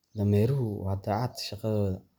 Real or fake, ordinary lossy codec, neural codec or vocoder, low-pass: real; none; none; none